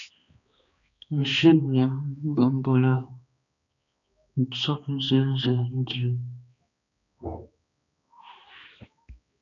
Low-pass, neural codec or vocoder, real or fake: 7.2 kHz; codec, 16 kHz, 2 kbps, X-Codec, HuBERT features, trained on balanced general audio; fake